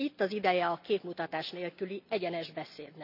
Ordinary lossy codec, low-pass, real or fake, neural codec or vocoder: none; 5.4 kHz; real; none